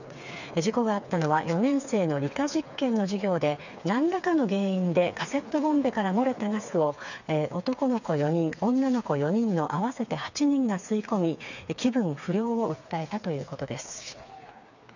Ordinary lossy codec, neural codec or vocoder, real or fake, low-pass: none; codec, 16 kHz, 4 kbps, FreqCodec, smaller model; fake; 7.2 kHz